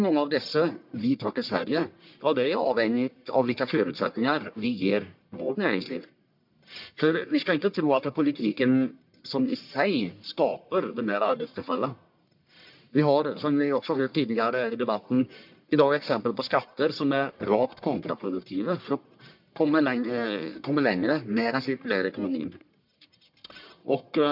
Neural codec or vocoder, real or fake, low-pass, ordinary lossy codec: codec, 44.1 kHz, 1.7 kbps, Pupu-Codec; fake; 5.4 kHz; MP3, 48 kbps